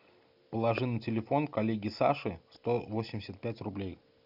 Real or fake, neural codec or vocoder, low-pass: real; none; 5.4 kHz